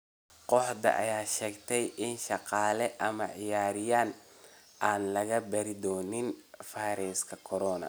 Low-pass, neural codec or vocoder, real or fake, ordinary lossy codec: none; none; real; none